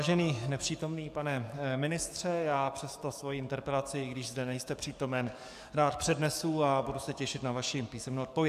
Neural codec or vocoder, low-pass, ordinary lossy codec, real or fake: codec, 44.1 kHz, 7.8 kbps, DAC; 14.4 kHz; AAC, 96 kbps; fake